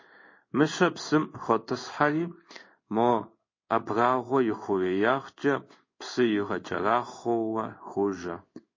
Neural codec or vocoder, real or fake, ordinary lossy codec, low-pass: codec, 16 kHz in and 24 kHz out, 1 kbps, XY-Tokenizer; fake; MP3, 32 kbps; 7.2 kHz